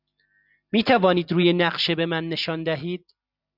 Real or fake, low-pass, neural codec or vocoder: real; 5.4 kHz; none